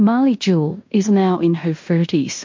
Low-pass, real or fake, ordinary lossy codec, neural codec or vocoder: 7.2 kHz; fake; MP3, 48 kbps; codec, 16 kHz in and 24 kHz out, 0.9 kbps, LongCat-Audio-Codec, fine tuned four codebook decoder